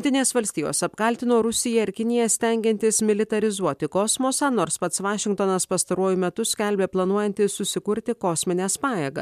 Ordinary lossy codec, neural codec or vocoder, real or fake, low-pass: MP3, 96 kbps; none; real; 14.4 kHz